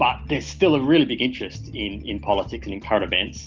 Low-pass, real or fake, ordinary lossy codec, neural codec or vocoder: 7.2 kHz; real; Opus, 24 kbps; none